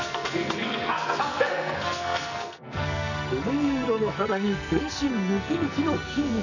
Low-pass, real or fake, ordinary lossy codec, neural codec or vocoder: 7.2 kHz; fake; none; codec, 44.1 kHz, 2.6 kbps, SNAC